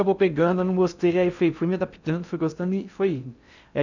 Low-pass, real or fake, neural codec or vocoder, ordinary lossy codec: 7.2 kHz; fake; codec, 16 kHz in and 24 kHz out, 0.6 kbps, FocalCodec, streaming, 2048 codes; none